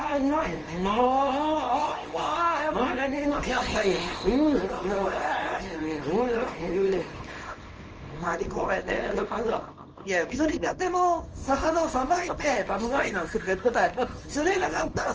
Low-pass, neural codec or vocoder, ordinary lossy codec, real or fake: 7.2 kHz; codec, 24 kHz, 0.9 kbps, WavTokenizer, small release; Opus, 24 kbps; fake